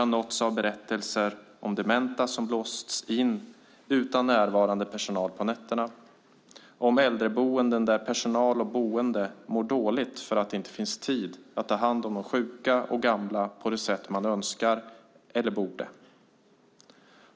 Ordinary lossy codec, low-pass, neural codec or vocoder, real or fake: none; none; none; real